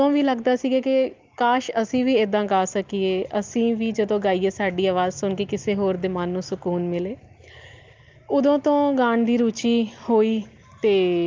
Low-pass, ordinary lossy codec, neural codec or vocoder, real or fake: 7.2 kHz; Opus, 32 kbps; none; real